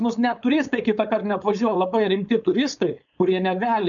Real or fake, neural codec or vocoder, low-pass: fake; codec, 16 kHz, 4.8 kbps, FACodec; 7.2 kHz